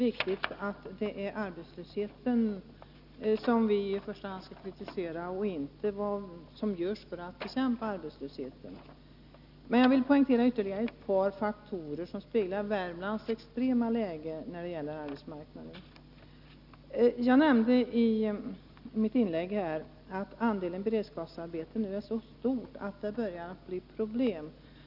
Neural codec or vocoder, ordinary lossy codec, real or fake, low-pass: none; none; real; 5.4 kHz